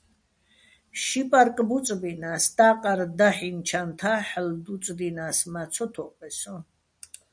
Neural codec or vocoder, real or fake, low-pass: none; real; 9.9 kHz